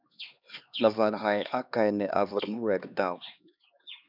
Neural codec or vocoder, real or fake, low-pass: codec, 16 kHz, 2 kbps, X-Codec, HuBERT features, trained on LibriSpeech; fake; 5.4 kHz